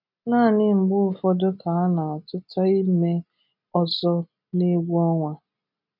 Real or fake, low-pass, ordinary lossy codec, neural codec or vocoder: real; 5.4 kHz; none; none